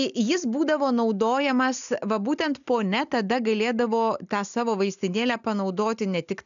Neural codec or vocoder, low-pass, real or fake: none; 7.2 kHz; real